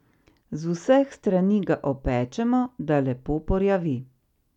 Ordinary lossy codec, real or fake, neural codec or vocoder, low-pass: none; real; none; 19.8 kHz